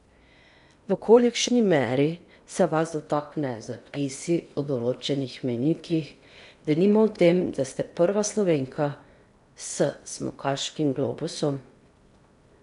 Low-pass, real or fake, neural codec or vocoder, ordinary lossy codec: 10.8 kHz; fake; codec, 16 kHz in and 24 kHz out, 0.8 kbps, FocalCodec, streaming, 65536 codes; none